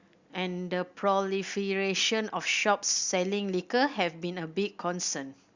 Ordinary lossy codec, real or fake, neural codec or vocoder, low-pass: Opus, 64 kbps; real; none; 7.2 kHz